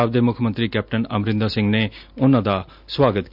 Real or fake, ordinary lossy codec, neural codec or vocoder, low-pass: real; none; none; 5.4 kHz